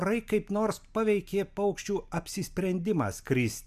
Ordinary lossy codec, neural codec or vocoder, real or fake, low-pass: MP3, 96 kbps; none; real; 14.4 kHz